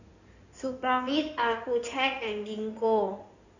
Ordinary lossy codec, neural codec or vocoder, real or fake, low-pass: none; codec, 16 kHz in and 24 kHz out, 2.2 kbps, FireRedTTS-2 codec; fake; 7.2 kHz